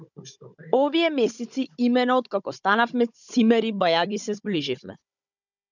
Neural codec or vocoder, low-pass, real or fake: codec, 16 kHz, 16 kbps, FunCodec, trained on Chinese and English, 50 frames a second; 7.2 kHz; fake